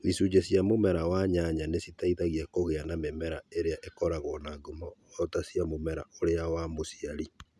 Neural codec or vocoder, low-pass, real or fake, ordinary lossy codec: none; none; real; none